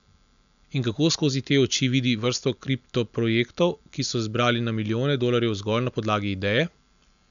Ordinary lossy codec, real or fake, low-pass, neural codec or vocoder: none; real; 7.2 kHz; none